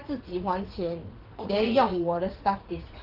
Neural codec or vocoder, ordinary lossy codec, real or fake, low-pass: vocoder, 44.1 kHz, 80 mel bands, Vocos; Opus, 16 kbps; fake; 5.4 kHz